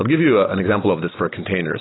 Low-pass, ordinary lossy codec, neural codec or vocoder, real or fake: 7.2 kHz; AAC, 16 kbps; none; real